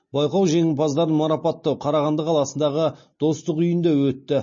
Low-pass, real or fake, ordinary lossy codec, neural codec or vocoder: 7.2 kHz; real; MP3, 32 kbps; none